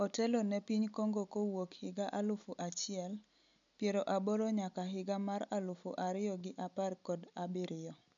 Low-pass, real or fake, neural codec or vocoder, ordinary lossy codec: 7.2 kHz; real; none; MP3, 96 kbps